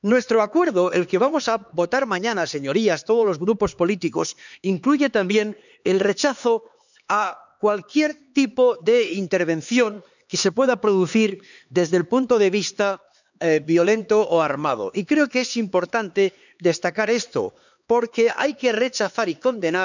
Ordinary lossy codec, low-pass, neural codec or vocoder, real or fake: none; 7.2 kHz; codec, 16 kHz, 4 kbps, X-Codec, HuBERT features, trained on LibriSpeech; fake